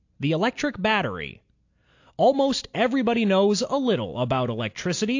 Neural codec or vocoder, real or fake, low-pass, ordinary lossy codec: none; real; 7.2 kHz; AAC, 48 kbps